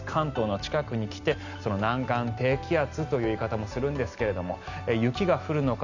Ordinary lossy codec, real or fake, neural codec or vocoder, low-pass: Opus, 64 kbps; real; none; 7.2 kHz